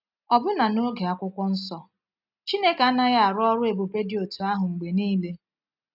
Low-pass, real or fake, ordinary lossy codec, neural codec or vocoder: 5.4 kHz; real; none; none